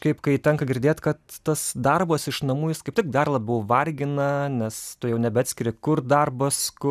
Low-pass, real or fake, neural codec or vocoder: 14.4 kHz; real; none